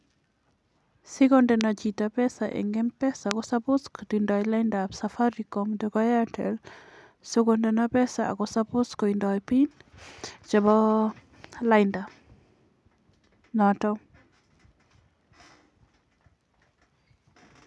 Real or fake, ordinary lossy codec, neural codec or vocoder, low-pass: real; none; none; none